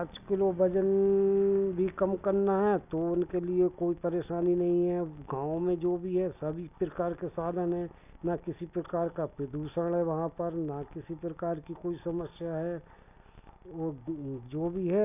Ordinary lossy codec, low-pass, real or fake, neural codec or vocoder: none; 3.6 kHz; real; none